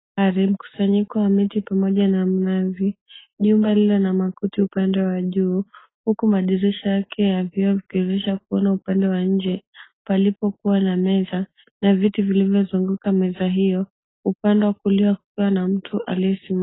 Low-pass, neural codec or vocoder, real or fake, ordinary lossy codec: 7.2 kHz; none; real; AAC, 16 kbps